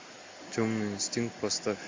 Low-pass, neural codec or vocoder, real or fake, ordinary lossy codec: 7.2 kHz; none; real; MP3, 48 kbps